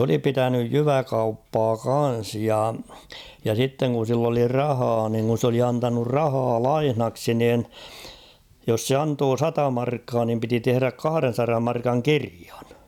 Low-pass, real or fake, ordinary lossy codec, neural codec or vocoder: 19.8 kHz; real; none; none